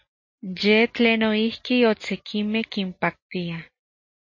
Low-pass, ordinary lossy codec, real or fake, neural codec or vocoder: 7.2 kHz; MP3, 32 kbps; real; none